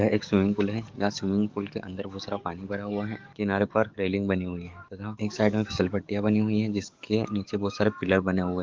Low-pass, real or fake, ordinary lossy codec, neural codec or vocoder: 7.2 kHz; fake; Opus, 32 kbps; codec, 44.1 kHz, 7.8 kbps, DAC